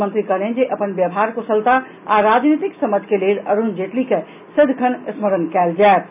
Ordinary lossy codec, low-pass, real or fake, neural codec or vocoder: none; 3.6 kHz; real; none